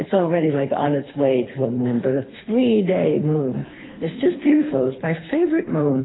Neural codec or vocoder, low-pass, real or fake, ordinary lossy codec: codec, 24 kHz, 3 kbps, HILCodec; 7.2 kHz; fake; AAC, 16 kbps